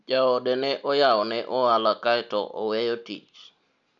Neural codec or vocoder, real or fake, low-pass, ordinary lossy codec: none; real; 7.2 kHz; none